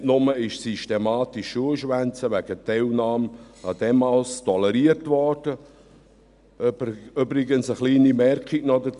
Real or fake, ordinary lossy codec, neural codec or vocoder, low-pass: real; none; none; 10.8 kHz